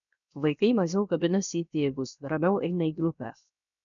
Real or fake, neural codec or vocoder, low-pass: fake; codec, 16 kHz, 0.7 kbps, FocalCodec; 7.2 kHz